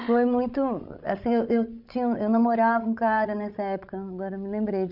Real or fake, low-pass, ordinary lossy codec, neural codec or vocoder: fake; 5.4 kHz; none; codec, 16 kHz, 16 kbps, FreqCodec, larger model